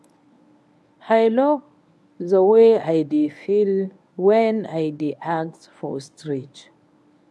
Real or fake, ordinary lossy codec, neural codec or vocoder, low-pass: fake; none; codec, 24 kHz, 0.9 kbps, WavTokenizer, medium speech release version 1; none